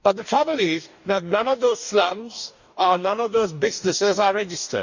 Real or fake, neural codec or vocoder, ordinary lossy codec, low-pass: fake; codec, 44.1 kHz, 2.6 kbps, DAC; none; 7.2 kHz